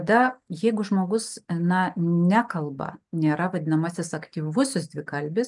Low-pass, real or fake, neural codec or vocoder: 10.8 kHz; real; none